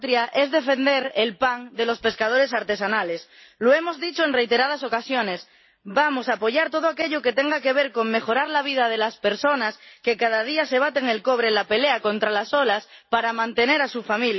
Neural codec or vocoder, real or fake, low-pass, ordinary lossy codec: none; real; 7.2 kHz; MP3, 24 kbps